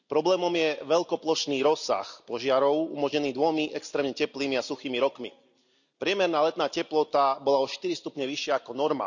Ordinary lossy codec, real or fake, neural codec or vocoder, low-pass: none; real; none; 7.2 kHz